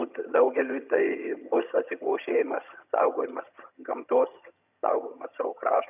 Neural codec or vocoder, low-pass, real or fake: vocoder, 22.05 kHz, 80 mel bands, HiFi-GAN; 3.6 kHz; fake